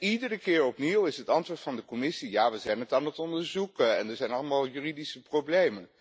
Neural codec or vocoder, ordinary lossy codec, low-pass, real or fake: none; none; none; real